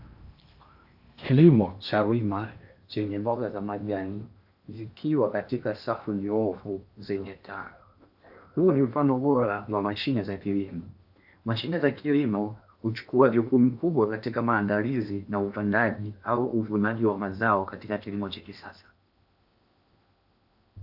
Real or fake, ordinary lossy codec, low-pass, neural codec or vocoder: fake; AAC, 48 kbps; 5.4 kHz; codec, 16 kHz in and 24 kHz out, 0.8 kbps, FocalCodec, streaming, 65536 codes